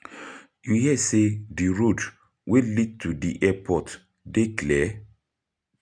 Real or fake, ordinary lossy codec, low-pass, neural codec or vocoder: real; none; 9.9 kHz; none